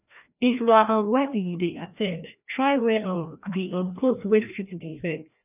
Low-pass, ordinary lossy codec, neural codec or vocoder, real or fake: 3.6 kHz; none; codec, 16 kHz, 1 kbps, FreqCodec, larger model; fake